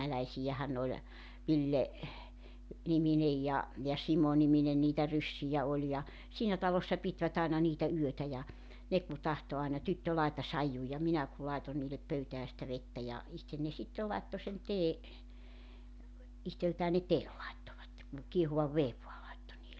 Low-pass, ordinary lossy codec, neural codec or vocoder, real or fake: none; none; none; real